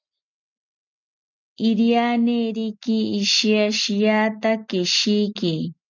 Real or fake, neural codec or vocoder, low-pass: real; none; 7.2 kHz